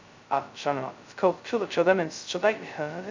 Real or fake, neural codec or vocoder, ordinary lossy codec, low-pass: fake; codec, 16 kHz, 0.2 kbps, FocalCodec; MP3, 64 kbps; 7.2 kHz